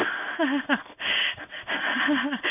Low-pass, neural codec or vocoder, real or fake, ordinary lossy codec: 3.6 kHz; codec, 16 kHz in and 24 kHz out, 1 kbps, XY-Tokenizer; fake; none